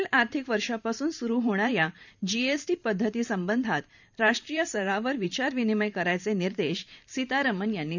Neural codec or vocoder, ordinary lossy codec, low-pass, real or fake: vocoder, 44.1 kHz, 128 mel bands every 512 samples, BigVGAN v2; none; 7.2 kHz; fake